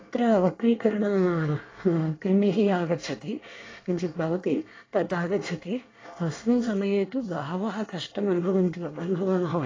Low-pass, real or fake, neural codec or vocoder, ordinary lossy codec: 7.2 kHz; fake; codec, 24 kHz, 1 kbps, SNAC; AAC, 32 kbps